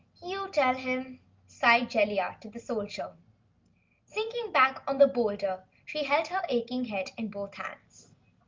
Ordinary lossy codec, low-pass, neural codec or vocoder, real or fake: Opus, 24 kbps; 7.2 kHz; none; real